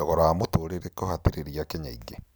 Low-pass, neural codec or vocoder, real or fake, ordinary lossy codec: none; none; real; none